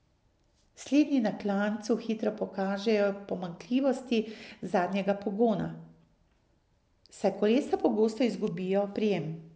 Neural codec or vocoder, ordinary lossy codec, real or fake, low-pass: none; none; real; none